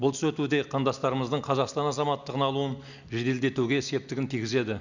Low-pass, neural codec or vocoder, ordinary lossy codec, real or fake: 7.2 kHz; none; none; real